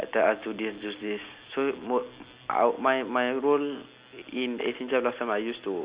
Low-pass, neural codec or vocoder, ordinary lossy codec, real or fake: 3.6 kHz; none; Opus, 64 kbps; real